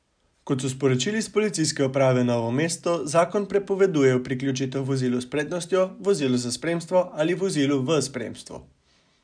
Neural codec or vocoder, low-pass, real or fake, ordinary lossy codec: none; 9.9 kHz; real; none